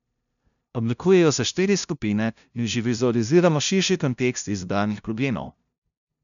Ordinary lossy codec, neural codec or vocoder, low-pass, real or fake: none; codec, 16 kHz, 0.5 kbps, FunCodec, trained on LibriTTS, 25 frames a second; 7.2 kHz; fake